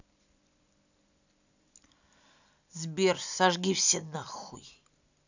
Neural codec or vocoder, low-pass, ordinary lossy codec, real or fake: none; 7.2 kHz; none; real